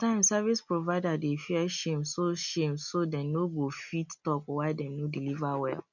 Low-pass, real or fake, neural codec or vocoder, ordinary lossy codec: 7.2 kHz; real; none; none